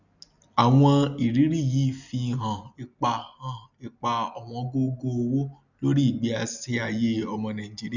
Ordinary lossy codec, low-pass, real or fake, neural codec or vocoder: none; 7.2 kHz; real; none